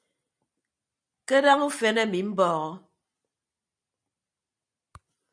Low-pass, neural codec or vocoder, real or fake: 9.9 kHz; none; real